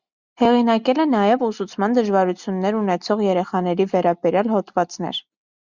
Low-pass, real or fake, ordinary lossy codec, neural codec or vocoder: 7.2 kHz; real; Opus, 64 kbps; none